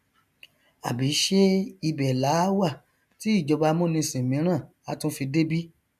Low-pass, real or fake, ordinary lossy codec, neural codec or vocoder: 14.4 kHz; real; none; none